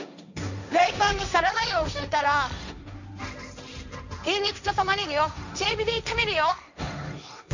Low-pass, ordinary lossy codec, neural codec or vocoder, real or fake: 7.2 kHz; none; codec, 16 kHz, 1.1 kbps, Voila-Tokenizer; fake